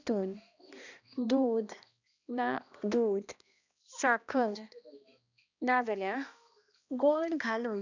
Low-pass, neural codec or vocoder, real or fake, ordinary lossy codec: 7.2 kHz; codec, 16 kHz, 1 kbps, X-Codec, HuBERT features, trained on balanced general audio; fake; none